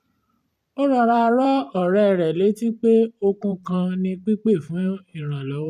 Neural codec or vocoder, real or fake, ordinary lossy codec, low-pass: vocoder, 44.1 kHz, 128 mel bands every 512 samples, BigVGAN v2; fake; none; 14.4 kHz